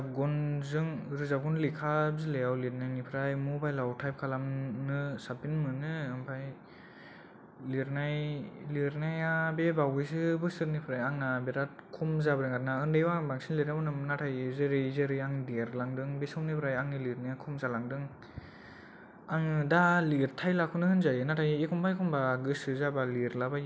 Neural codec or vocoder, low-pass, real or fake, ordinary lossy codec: none; none; real; none